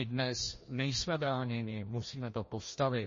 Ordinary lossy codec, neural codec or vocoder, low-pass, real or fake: MP3, 32 kbps; codec, 16 kHz, 1 kbps, FreqCodec, larger model; 7.2 kHz; fake